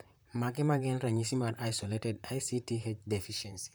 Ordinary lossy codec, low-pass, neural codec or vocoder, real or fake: none; none; vocoder, 44.1 kHz, 128 mel bands, Pupu-Vocoder; fake